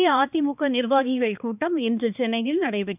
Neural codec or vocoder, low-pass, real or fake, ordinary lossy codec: codec, 16 kHz, 2 kbps, X-Codec, HuBERT features, trained on balanced general audio; 3.6 kHz; fake; none